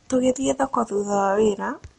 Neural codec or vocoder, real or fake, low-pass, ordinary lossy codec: none; real; 14.4 kHz; MP3, 48 kbps